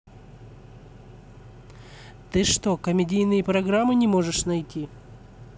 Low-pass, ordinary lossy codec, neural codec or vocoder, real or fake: none; none; none; real